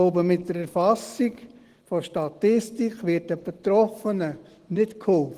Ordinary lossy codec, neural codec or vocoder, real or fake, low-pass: Opus, 16 kbps; none; real; 14.4 kHz